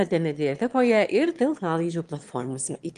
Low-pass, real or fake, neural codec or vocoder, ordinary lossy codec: 9.9 kHz; fake; autoencoder, 22.05 kHz, a latent of 192 numbers a frame, VITS, trained on one speaker; Opus, 24 kbps